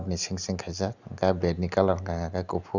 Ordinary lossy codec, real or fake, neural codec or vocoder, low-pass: none; real; none; 7.2 kHz